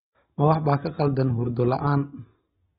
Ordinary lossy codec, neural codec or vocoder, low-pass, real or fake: AAC, 16 kbps; none; 7.2 kHz; real